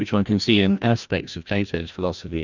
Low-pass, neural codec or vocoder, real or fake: 7.2 kHz; codec, 16 kHz, 1 kbps, FreqCodec, larger model; fake